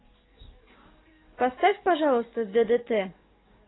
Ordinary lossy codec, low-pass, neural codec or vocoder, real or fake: AAC, 16 kbps; 7.2 kHz; codec, 44.1 kHz, 7.8 kbps, DAC; fake